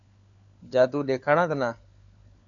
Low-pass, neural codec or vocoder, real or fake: 7.2 kHz; codec, 16 kHz, 4 kbps, FunCodec, trained on LibriTTS, 50 frames a second; fake